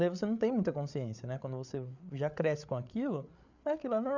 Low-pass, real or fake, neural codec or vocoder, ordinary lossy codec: 7.2 kHz; fake; codec, 16 kHz, 8 kbps, FreqCodec, larger model; none